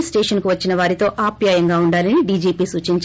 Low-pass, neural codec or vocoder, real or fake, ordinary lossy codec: none; none; real; none